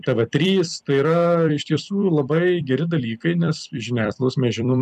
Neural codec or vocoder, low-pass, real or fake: vocoder, 44.1 kHz, 128 mel bands every 256 samples, BigVGAN v2; 14.4 kHz; fake